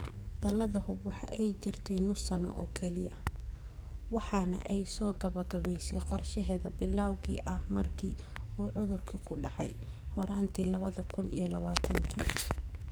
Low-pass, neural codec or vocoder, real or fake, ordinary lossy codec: none; codec, 44.1 kHz, 2.6 kbps, SNAC; fake; none